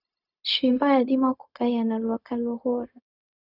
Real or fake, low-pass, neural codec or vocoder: fake; 5.4 kHz; codec, 16 kHz, 0.4 kbps, LongCat-Audio-Codec